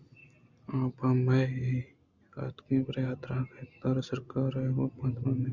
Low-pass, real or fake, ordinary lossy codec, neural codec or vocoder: 7.2 kHz; real; Opus, 64 kbps; none